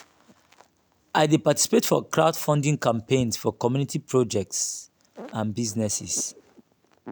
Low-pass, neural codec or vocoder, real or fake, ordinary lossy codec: none; none; real; none